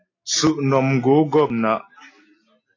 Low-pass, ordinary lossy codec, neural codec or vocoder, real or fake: 7.2 kHz; AAC, 32 kbps; none; real